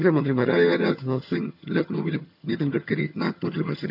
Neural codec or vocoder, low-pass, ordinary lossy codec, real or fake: vocoder, 22.05 kHz, 80 mel bands, HiFi-GAN; 5.4 kHz; none; fake